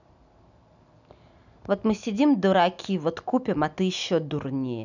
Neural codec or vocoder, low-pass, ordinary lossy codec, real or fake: none; 7.2 kHz; none; real